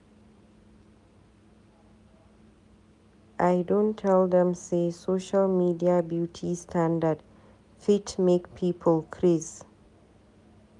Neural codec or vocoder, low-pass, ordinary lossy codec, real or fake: none; 10.8 kHz; none; real